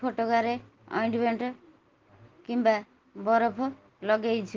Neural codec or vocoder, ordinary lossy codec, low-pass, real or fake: none; Opus, 16 kbps; 7.2 kHz; real